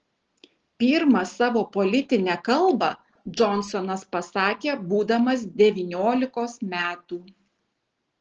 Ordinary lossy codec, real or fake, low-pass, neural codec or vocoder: Opus, 16 kbps; real; 7.2 kHz; none